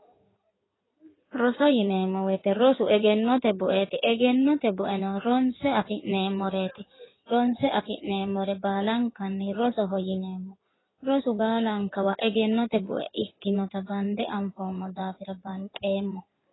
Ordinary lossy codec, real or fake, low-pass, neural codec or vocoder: AAC, 16 kbps; fake; 7.2 kHz; codec, 16 kHz in and 24 kHz out, 2.2 kbps, FireRedTTS-2 codec